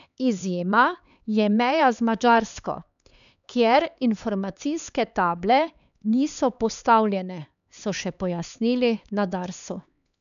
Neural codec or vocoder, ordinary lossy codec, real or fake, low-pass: codec, 16 kHz, 4 kbps, X-Codec, HuBERT features, trained on LibriSpeech; none; fake; 7.2 kHz